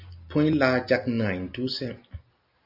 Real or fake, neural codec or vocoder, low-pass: real; none; 5.4 kHz